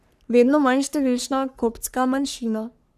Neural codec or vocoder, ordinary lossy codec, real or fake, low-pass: codec, 44.1 kHz, 3.4 kbps, Pupu-Codec; none; fake; 14.4 kHz